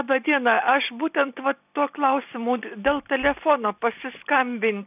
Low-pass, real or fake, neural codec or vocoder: 3.6 kHz; real; none